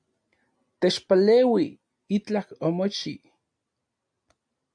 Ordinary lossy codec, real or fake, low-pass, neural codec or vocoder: AAC, 64 kbps; real; 9.9 kHz; none